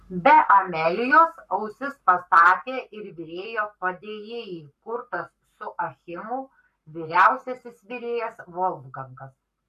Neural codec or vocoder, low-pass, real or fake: codec, 44.1 kHz, 7.8 kbps, Pupu-Codec; 14.4 kHz; fake